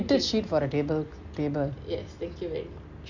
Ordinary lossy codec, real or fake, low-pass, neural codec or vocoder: none; real; 7.2 kHz; none